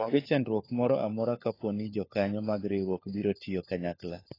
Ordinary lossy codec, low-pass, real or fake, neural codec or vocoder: AAC, 24 kbps; 5.4 kHz; fake; codec, 16 kHz, 4 kbps, FunCodec, trained on LibriTTS, 50 frames a second